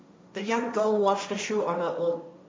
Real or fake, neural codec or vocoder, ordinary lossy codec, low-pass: fake; codec, 16 kHz, 1.1 kbps, Voila-Tokenizer; none; none